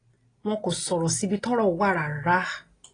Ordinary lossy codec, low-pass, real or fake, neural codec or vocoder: AAC, 32 kbps; 9.9 kHz; real; none